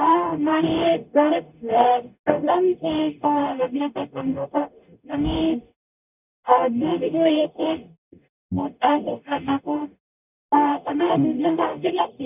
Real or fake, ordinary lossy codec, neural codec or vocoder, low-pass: fake; none; codec, 44.1 kHz, 0.9 kbps, DAC; 3.6 kHz